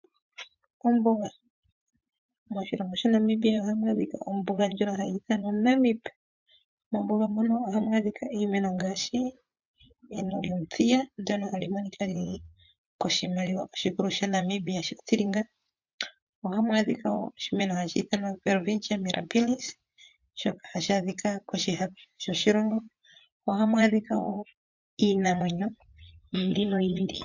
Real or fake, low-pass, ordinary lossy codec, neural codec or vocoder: fake; 7.2 kHz; MP3, 64 kbps; vocoder, 44.1 kHz, 80 mel bands, Vocos